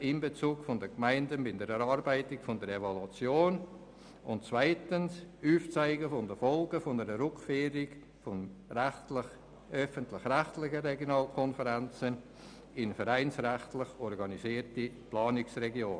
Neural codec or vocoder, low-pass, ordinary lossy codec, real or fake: none; 9.9 kHz; none; real